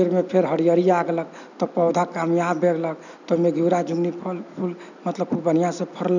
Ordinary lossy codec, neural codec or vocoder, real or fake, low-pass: none; none; real; 7.2 kHz